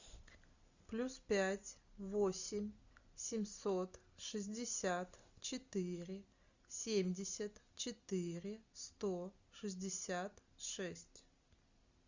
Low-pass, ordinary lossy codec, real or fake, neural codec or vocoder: 7.2 kHz; Opus, 64 kbps; real; none